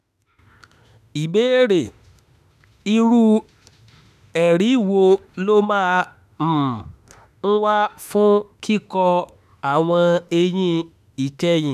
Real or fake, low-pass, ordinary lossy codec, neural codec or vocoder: fake; 14.4 kHz; none; autoencoder, 48 kHz, 32 numbers a frame, DAC-VAE, trained on Japanese speech